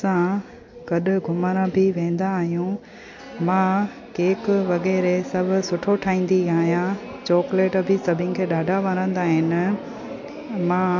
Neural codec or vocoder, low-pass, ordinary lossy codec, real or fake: none; 7.2 kHz; MP3, 48 kbps; real